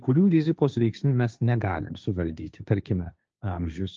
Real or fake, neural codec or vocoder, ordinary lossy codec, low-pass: fake; codec, 16 kHz, 1.1 kbps, Voila-Tokenizer; Opus, 24 kbps; 7.2 kHz